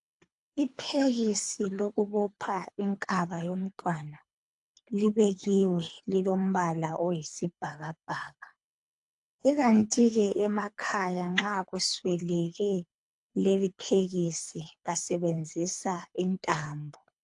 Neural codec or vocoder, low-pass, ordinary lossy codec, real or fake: codec, 24 kHz, 3 kbps, HILCodec; 10.8 kHz; AAC, 64 kbps; fake